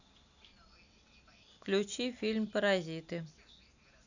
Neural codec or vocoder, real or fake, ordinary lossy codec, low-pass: none; real; none; 7.2 kHz